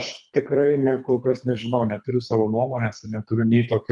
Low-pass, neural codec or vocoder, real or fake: 10.8 kHz; codec, 24 kHz, 3 kbps, HILCodec; fake